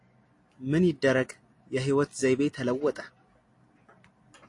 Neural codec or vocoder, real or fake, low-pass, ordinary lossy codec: none; real; 10.8 kHz; AAC, 48 kbps